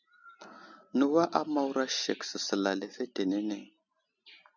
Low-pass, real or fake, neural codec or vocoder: 7.2 kHz; real; none